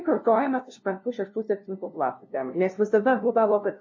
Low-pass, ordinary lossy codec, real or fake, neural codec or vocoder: 7.2 kHz; MP3, 32 kbps; fake; codec, 16 kHz, 0.5 kbps, FunCodec, trained on LibriTTS, 25 frames a second